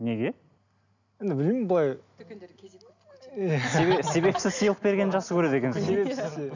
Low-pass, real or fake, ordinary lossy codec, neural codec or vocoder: 7.2 kHz; real; none; none